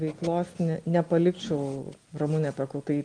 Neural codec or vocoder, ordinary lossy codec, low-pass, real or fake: none; Opus, 24 kbps; 9.9 kHz; real